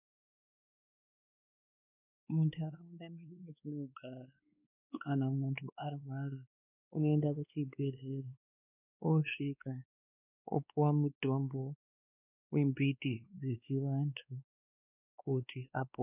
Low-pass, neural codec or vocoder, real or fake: 3.6 kHz; codec, 16 kHz, 2 kbps, X-Codec, WavLM features, trained on Multilingual LibriSpeech; fake